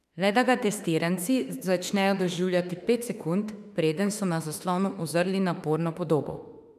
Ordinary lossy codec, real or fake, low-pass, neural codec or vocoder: none; fake; 14.4 kHz; autoencoder, 48 kHz, 32 numbers a frame, DAC-VAE, trained on Japanese speech